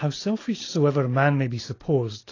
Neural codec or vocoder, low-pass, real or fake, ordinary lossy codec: none; 7.2 kHz; real; AAC, 32 kbps